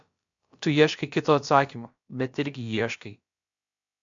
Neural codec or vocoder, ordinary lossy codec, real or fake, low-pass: codec, 16 kHz, about 1 kbps, DyCAST, with the encoder's durations; MP3, 64 kbps; fake; 7.2 kHz